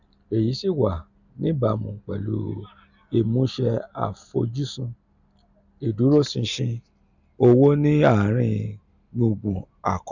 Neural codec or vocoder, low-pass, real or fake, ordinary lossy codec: none; 7.2 kHz; real; none